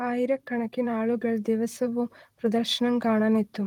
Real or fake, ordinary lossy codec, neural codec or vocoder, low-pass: fake; Opus, 24 kbps; vocoder, 44.1 kHz, 128 mel bands, Pupu-Vocoder; 19.8 kHz